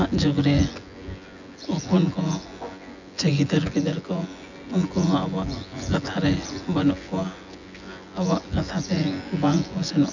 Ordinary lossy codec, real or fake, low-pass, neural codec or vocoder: none; fake; 7.2 kHz; vocoder, 24 kHz, 100 mel bands, Vocos